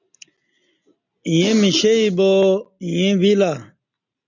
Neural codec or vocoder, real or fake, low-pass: none; real; 7.2 kHz